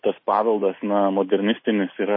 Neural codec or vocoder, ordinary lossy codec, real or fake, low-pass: none; MP3, 24 kbps; real; 5.4 kHz